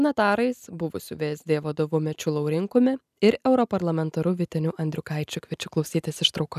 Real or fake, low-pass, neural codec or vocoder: real; 14.4 kHz; none